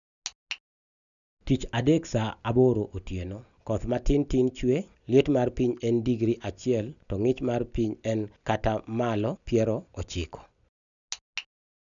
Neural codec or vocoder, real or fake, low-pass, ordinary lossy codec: none; real; 7.2 kHz; none